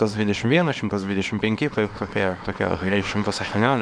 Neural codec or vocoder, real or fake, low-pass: codec, 24 kHz, 0.9 kbps, WavTokenizer, small release; fake; 9.9 kHz